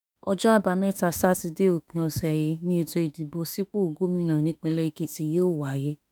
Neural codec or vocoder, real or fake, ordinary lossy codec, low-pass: autoencoder, 48 kHz, 32 numbers a frame, DAC-VAE, trained on Japanese speech; fake; none; none